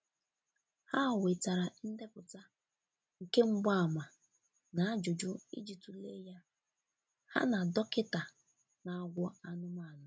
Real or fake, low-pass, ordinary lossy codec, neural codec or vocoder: real; none; none; none